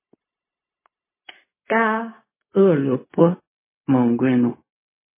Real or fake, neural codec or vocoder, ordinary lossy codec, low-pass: fake; codec, 16 kHz, 0.4 kbps, LongCat-Audio-Codec; MP3, 16 kbps; 3.6 kHz